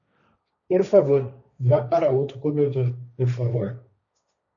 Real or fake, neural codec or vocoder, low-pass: fake; codec, 16 kHz, 1.1 kbps, Voila-Tokenizer; 7.2 kHz